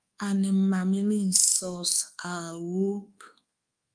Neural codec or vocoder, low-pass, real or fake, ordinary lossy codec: codec, 24 kHz, 1.2 kbps, DualCodec; 9.9 kHz; fake; Opus, 32 kbps